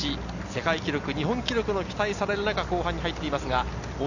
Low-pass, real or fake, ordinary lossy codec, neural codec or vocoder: 7.2 kHz; real; none; none